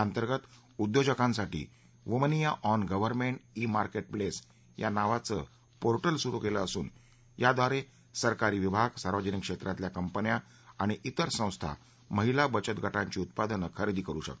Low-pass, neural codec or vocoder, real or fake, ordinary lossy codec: none; none; real; none